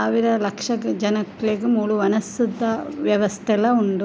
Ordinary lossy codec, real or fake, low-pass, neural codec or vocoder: none; real; none; none